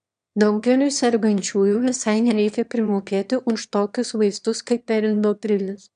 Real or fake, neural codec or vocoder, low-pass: fake; autoencoder, 22.05 kHz, a latent of 192 numbers a frame, VITS, trained on one speaker; 9.9 kHz